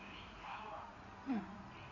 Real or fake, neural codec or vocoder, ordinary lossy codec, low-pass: fake; codec, 16 kHz in and 24 kHz out, 2.2 kbps, FireRedTTS-2 codec; MP3, 64 kbps; 7.2 kHz